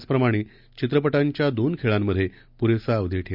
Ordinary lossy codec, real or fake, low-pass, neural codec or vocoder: none; real; 5.4 kHz; none